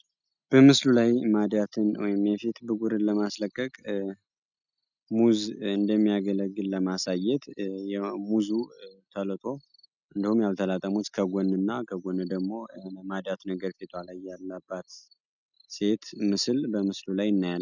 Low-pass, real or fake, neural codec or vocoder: 7.2 kHz; real; none